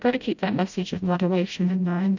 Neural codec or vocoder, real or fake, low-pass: codec, 16 kHz, 0.5 kbps, FreqCodec, smaller model; fake; 7.2 kHz